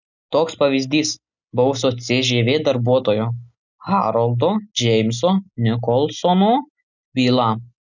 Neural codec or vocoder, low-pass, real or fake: none; 7.2 kHz; real